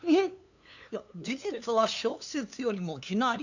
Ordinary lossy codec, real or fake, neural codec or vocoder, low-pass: none; fake; codec, 16 kHz, 2 kbps, FunCodec, trained on LibriTTS, 25 frames a second; 7.2 kHz